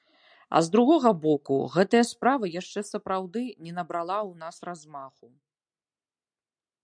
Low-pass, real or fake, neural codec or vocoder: 9.9 kHz; real; none